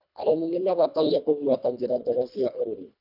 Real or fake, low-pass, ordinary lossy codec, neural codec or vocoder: fake; 5.4 kHz; none; codec, 24 kHz, 1.5 kbps, HILCodec